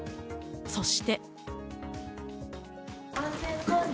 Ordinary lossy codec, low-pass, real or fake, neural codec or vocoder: none; none; real; none